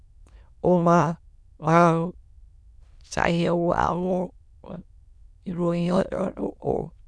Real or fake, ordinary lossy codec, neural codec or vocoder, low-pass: fake; none; autoencoder, 22.05 kHz, a latent of 192 numbers a frame, VITS, trained on many speakers; none